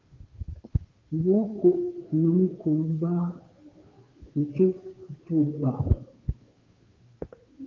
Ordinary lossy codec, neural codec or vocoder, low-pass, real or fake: Opus, 32 kbps; codec, 16 kHz, 2 kbps, FunCodec, trained on Chinese and English, 25 frames a second; 7.2 kHz; fake